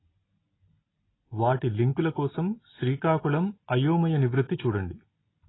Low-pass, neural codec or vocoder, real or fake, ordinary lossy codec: 7.2 kHz; none; real; AAC, 16 kbps